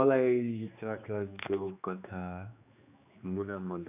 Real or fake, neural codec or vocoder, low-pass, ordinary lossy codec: fake; codec, 16 kHz, 2 kbps, X-Codec, HuBERT features, trained on general audio; 3.6 kHz; none